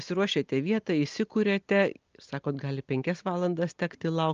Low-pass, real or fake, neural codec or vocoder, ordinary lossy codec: 7.2 kHz; real; none; Opus, 24 kbps